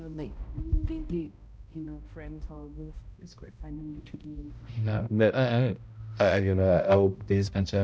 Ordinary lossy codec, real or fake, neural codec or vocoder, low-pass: none; fake; codec, 16 kHz, 0.5 kbps, X-Codec, HuBERT features, trained on balanced general audio; none